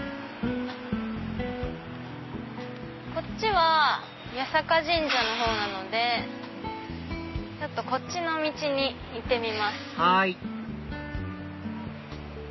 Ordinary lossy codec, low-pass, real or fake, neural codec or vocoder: MP3, 24 kbps; 7.2 kHz; real; none